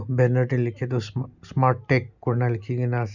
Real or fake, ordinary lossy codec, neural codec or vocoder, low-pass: real; none; none; 7.2 kHz